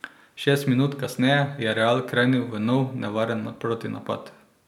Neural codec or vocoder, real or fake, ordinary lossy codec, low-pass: none; real; none; 19.8 kHz